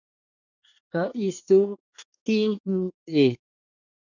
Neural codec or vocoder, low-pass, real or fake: codec, 24 kHz, 1 kbps, SNAC; 7.2 kHz; fake